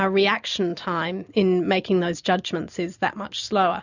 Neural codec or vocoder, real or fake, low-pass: none; real; 7.2 kHz